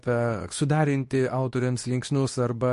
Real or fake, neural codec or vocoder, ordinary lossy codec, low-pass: fake; codec, 24 kHz, 0.9 kbps, WavTokenizer, medium speech release version 2; MP3, 48 kbps; 10.8 kHz